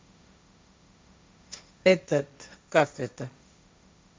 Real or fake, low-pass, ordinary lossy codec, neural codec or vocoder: fake; none; none; codec, 16 kHz, 1.1 kbps, Voila-Tokenizer